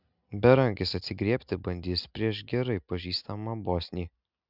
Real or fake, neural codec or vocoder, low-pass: real; none; 5.4 kHz